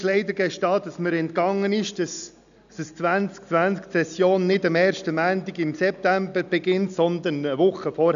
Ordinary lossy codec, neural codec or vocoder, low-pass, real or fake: none; none; 7.2 kHz; real